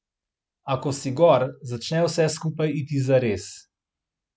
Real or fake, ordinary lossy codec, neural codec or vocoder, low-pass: real; none; none; none